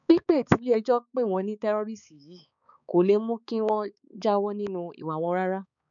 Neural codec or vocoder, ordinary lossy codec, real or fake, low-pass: codec, 16 kHz, 4 kbps, X-Codec, HuBERT features, trained on balanced general audio; none; fake; 7.2 kHz